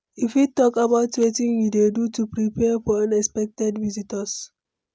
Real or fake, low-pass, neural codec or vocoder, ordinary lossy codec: real; none; none; none